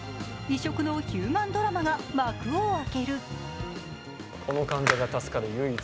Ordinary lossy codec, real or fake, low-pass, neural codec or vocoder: none; real; none; none